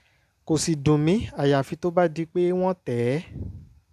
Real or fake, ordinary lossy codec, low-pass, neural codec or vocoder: real; AAC, 96 kbps; 14.4 kHz; none